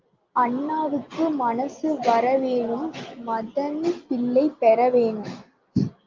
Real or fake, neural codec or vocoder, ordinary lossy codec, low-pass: real; none; Opus, 24 kbps; 7.2 kHz